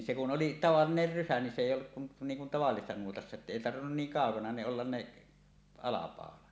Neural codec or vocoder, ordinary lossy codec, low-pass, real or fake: none; none; none; real